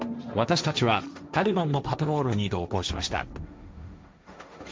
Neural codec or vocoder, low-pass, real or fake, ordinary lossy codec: codec, 16 kHz, 1.1 kbps, Voila-Tokenizer; none; fake; none